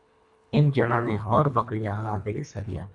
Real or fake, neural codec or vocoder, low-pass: fake; codec, 24 kHz, 1.5 kbps, HILCodec; 10.8 kHz